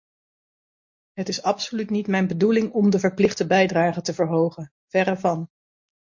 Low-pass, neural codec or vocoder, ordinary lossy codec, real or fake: 7.2 kHz; none; MP3, 48 kbps; real